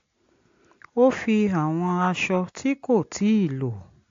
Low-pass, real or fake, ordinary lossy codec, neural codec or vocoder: 7.2 kHz; real; AAC, 48 kbps; none